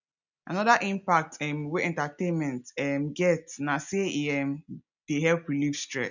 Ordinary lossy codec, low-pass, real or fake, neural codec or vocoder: none; 7.2 kHz; real; none